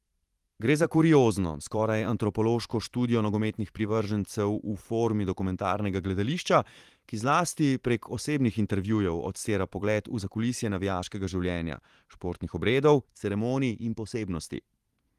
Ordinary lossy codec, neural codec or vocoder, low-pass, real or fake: Opus, 24 kbps; vocoder, 44.1 kHz, 128 mel bands every 512 samples, BigVGAN v2; 14.4 kHz; fake